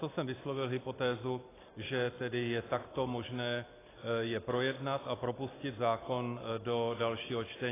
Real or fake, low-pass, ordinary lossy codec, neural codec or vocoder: real; 3.6 kHz; AAC, 16 kbps; none